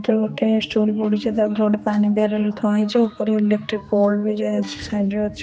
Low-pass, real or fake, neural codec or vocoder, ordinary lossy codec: none; fake; codec, 16 kHz, 2 kbps, X-Codec, HuBERT features, trained on general audio; none